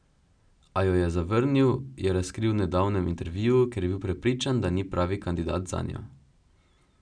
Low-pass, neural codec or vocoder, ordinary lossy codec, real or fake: 9.9 kHz; none; none; real